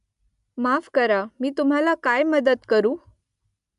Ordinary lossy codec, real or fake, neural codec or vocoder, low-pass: AAC, 96 kbps; real; none; 10.8 kHz